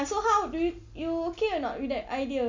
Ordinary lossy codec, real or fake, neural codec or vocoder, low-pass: MP3, 64 kbps; real; none; 7.2 kHz